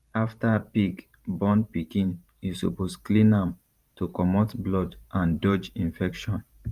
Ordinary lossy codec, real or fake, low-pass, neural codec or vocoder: Opus, 32 kbps; fake; 14.4 kHz; vocoder, 44.1 kHz, 128 mel bands every 512 samples, BigVGAN v2